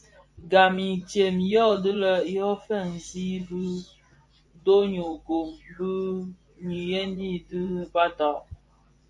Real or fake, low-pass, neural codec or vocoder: fake; 10.8 kHz; vocoder, 24 kHz, 100 mel bands, Vocos